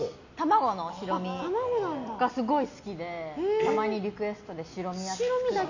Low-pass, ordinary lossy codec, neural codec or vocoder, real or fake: 7.2 kHz; none; none; real